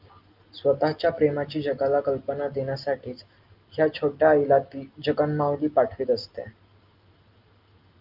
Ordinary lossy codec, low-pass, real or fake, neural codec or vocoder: Opus, 24 kbps; 5.4 kHz; real; none